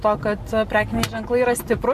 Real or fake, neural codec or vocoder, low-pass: real; none; 14.4 kHz